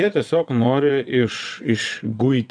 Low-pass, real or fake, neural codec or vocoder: 9.9 kHz; fake; vocoder, 22.05 kHz, 80 mel bands, WaveNeXt